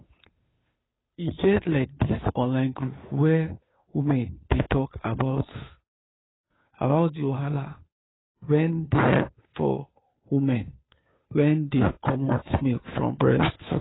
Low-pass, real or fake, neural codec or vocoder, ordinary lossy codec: 7.2 kHz; fake; codec, 16 kHz, 2 kbps, FunCodec, trained on Chinese and English, 25 frames a second; AAC, 16 kbps